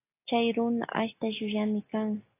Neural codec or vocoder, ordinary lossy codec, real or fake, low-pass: none; AAC, 16 kbps; real; 3.6 kHz